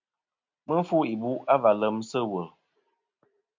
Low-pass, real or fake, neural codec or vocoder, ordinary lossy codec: 7.2 kHz; real; none; MP3, 64 kbps